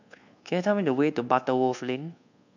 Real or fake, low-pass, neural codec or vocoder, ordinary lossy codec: fake; 7.2 kHz; codec, 24 kHz, 1.2 kbps, DualCodec; none